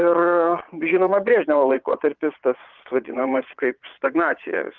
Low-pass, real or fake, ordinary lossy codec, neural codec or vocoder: 7.2 kHz; fake; Opus, 32 kbps; vocoder, 22.05 kHz, 80 mel bands, Vocos